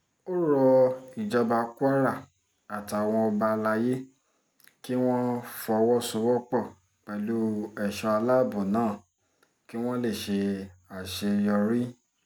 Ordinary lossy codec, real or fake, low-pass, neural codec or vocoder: none; real; none; none